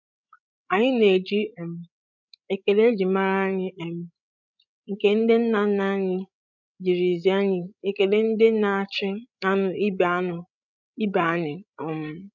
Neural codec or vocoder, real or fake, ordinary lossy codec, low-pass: codec, 16 kHz, 16 kbps, FreqCodec, larger model; fake; none; 7.2 kHz